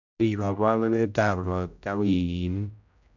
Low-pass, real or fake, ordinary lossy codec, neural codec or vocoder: 7.2 kHz; fake; none; codec, 16 kHz, 0.5 kbps, X-Codec, HuBERT features, trained on general audio